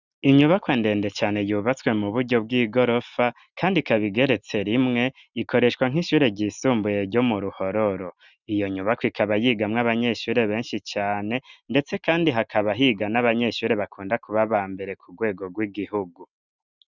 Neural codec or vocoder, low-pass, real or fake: none; 7.2 kHz; real